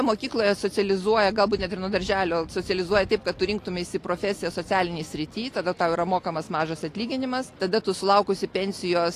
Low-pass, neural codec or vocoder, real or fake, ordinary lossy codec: 14.4 kHz; none; real; AAC, 48 kbps